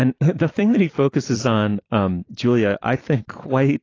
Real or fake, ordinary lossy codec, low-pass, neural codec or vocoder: fake; AAC, 32 kbps; 7.2 kHz; vocoder, 44.1 kHz, 128 mel bands every 256 samples, BigVGAN v2